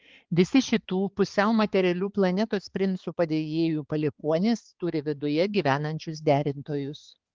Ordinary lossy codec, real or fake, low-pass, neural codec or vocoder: Opus, 16 kbps; fake; 7.2 kHz; codec, 16 kHz, 4 kbps, X-Codec, HuBERT features, trained on balanced general audio